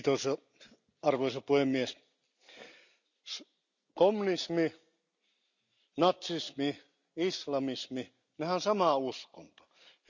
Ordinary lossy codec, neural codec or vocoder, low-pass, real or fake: none; none; 7.2 kHz; real